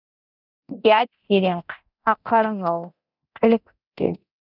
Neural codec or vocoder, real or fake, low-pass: codec, 24 kHz, 0.9 kbps, DualCodec; fake; 5.4 kHz